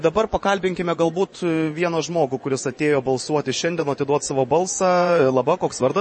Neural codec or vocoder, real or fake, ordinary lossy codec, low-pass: vocoder, 24 kHz, 100 mel bands, Vocos; fake; MP3, 32 kbps; 10.8 kHz